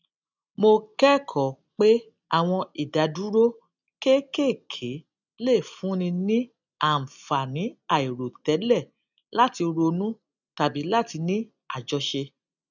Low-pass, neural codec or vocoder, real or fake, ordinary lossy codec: 7.2 kHz; none; real; none